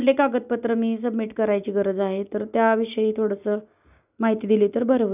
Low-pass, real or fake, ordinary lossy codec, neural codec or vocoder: 3.6 kHz; real; none; none